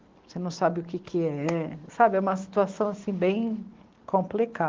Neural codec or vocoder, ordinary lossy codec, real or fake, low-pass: none; Opus, 16 kbps; real; 7.2 kHz